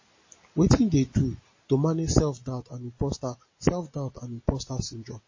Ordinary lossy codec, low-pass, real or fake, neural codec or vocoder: MP3, 32 kbps; 7.2 kHz; real; none